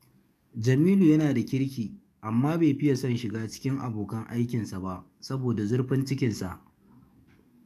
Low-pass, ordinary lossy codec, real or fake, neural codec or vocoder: 14.4 kHz; none; fake; codec, 44.1 kHz, 7.8 kbps, DAC